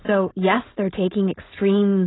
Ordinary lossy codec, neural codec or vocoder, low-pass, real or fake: AAC, 16 kbps; codec, 16 kHz, 4.8 kbps, FACodec; 7.2 kHz; fake